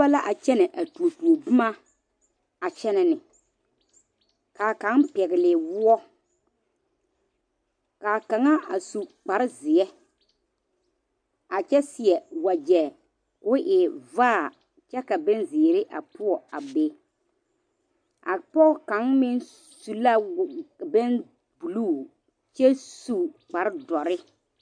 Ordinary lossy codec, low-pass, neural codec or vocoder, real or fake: MP3, 64 kbps; 9.9 kHz; none; real